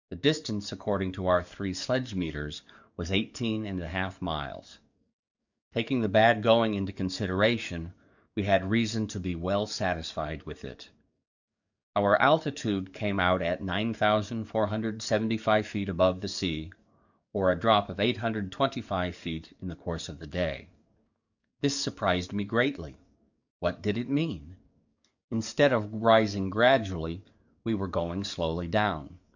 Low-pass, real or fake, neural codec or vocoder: 7.2 kHz; fake; codec, 44.1 kHz, 7.8 kbps, DAC